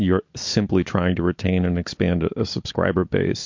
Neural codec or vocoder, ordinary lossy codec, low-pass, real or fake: none; MP3, 48 kbps; 7.2 kHz; real